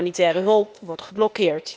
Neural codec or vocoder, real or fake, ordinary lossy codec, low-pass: codec, 16 kHz, 0.8 kbps, ZipCodec; fake; none; none